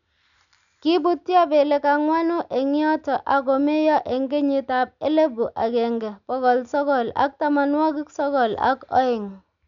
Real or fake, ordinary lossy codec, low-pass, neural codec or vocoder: real; none; 7.2 kHz; none